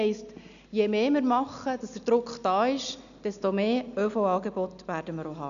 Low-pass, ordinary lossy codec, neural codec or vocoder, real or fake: 7.2 kHz; none; none; real